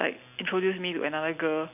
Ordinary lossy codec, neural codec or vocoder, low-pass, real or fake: none; none; 3.6 kHz; real